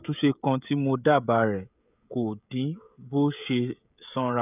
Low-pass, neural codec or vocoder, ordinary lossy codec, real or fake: 3.6 kHz; codec, 16 kHz, 16 kbps, FreqCodec, smaller model; none; fake